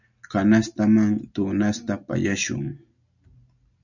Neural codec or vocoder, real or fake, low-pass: none; real; 7.2 kHz